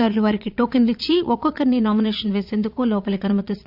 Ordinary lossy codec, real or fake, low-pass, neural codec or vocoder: AAC, 48 kbps; fake; 5.4 kHz; vocoder, 22.05 kHz, 80 mel bands, Vocos